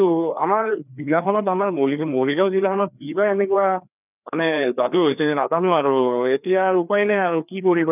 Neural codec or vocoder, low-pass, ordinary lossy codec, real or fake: codec, 16 kHz, 2 kbps, FreqCodec, larger model; 3.6 kHz; none; fake